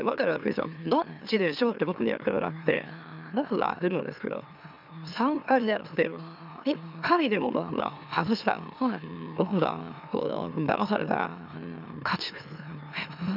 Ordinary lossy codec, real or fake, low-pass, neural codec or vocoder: none; fake; 5.4 kHz; autoencoder, 44.1 kHz, a latent of 192 numbers a frame, MeloTTS